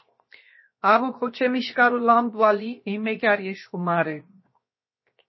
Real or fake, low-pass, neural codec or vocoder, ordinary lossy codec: fake; 7.2 kHz; codec, 16 kHz, 0.7 kbps, FocalCodec; MP3, 24 kbps